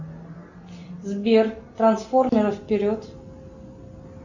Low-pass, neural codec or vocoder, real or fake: 7.2 kHz; none; real